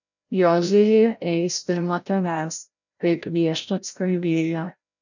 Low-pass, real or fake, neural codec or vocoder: 7.2 kHz; fake; codec, 16 kHz, 0.5 kbps, FreqCodec, larger model